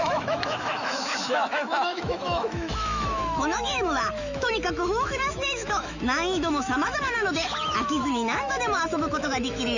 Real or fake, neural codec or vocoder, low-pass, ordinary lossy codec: fake; autoencoder, 48 kHz, 128 numbers a frame, DAC-VAE, trained on Japanese speech; 7.2 kHz; none